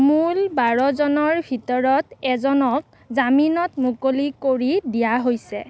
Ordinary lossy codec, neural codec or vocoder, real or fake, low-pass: none; none; real; none